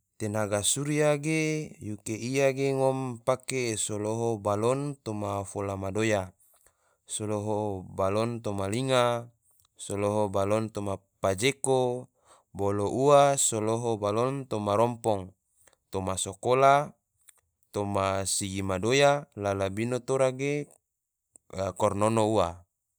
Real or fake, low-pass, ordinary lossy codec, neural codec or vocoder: real; none; none; none